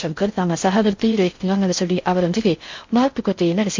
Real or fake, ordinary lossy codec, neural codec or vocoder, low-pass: fake; MP3, 48 kbps; codec, 16 kHz in and 24 kHz out, 0.6 kbps, FocalCodec, streaming, 4096 codes; 7.2 kHz